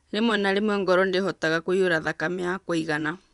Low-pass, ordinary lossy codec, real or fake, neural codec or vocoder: 10.8 kHz; none; fake; vocoder, 24 kHz, 100 mel bands, Vocos